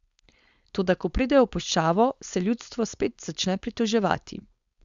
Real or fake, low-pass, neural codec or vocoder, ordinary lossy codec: fake; 7.2 kHz; codec, 16 kHz, 4.8 kbps, FACodec; Opus, 64 kbps